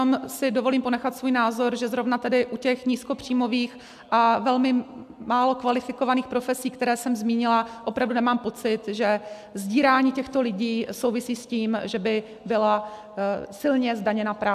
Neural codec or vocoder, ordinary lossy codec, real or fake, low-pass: none; AAC, 96 kbps; real; 14.4 kHz